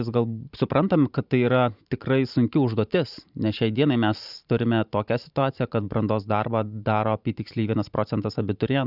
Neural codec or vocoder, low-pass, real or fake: vocoder, 44.1 kHz, 128 mel bands every 512 samples, BigVGAN v2; 5.4 kHz; fake